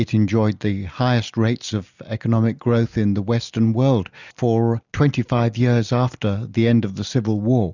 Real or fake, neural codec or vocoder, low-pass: real; none; 7.2 kHz